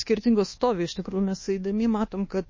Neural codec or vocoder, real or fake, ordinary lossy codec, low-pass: codec, 24 kHz, 1.2 kbps, DualCodec; fake; MP3, 32 kbps; 7.2 kHz